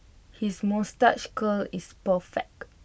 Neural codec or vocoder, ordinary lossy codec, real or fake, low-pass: none; none; real; none